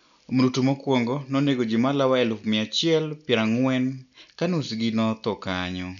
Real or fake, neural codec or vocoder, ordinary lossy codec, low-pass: real; none; none; 7.2 kHz